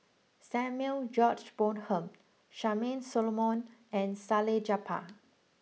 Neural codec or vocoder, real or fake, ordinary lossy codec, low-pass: none; real; none; none